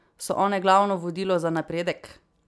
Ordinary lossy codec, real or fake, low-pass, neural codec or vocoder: none; real; none; none